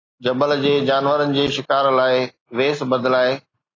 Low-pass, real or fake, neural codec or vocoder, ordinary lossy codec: 7.2 kHz; real; none; AAC, 32 kbps